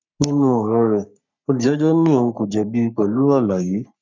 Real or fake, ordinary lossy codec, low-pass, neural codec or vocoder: fake; none; 7.2 kHz; codec, 44.1 kHz, 3.4 kbps, Pupu-Codec